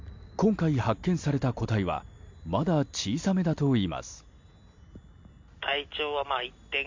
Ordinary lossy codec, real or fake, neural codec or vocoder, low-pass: MP3, 48 kbps; real; none; 7.2 kHz